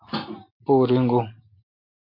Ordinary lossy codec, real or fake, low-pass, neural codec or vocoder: MP3, 32 kbps; real; 5.4 kHz; none